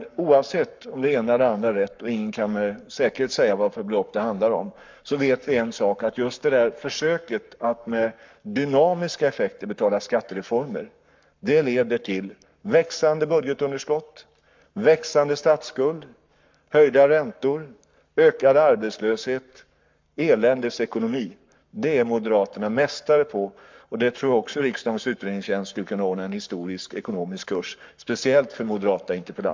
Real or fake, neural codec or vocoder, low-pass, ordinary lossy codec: fake; codec, 44.1 kHz, 7.8 kbps, Pupu-Codec; 7.2 kHz; MP3, 64 kbps